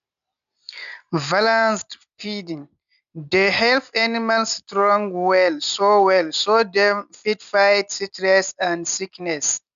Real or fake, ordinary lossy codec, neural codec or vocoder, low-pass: real; none; none; 7.2 kHz